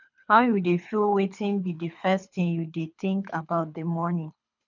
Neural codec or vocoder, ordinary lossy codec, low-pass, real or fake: codec, 24 kHz, 3 kbps, HILCodec; none; 7.2 kHz; fake